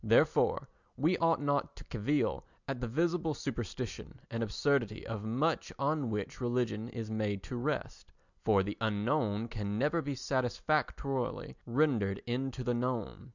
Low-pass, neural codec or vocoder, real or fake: 7.2 kHz; none; real